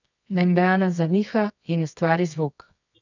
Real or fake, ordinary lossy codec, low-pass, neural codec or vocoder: fake; none; 7.2 kHz; codec, 24 kHz, 0.9 kbps, WavTokenizer, medium music audio release